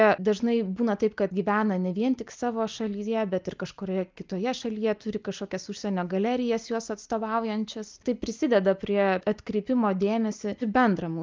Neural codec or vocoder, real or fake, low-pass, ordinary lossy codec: none; real; 7.2 kHz; Opus, 24 kbps